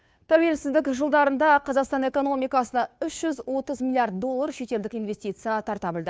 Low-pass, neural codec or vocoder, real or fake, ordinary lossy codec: none; codec, 16 kHz, 2 kbps, FunCodec, trained on Chinese and English, 25 frames a second; fake; none